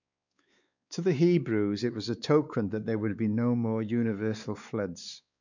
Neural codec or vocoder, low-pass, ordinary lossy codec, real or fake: codec, 16 kHz, 4 kbps, X-Codec, WavLM features, trained on Multilingual LibriSpeech; 7.2 kHz; none; fake